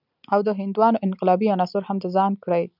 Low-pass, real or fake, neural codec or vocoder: 5.4 kHz; real; none